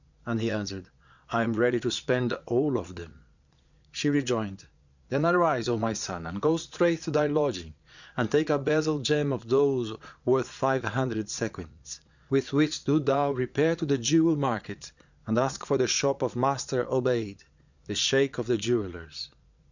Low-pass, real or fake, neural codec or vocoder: 7.2 kHz; fake; vocoder, 22.05 kHz, 80 mel bands, Vocos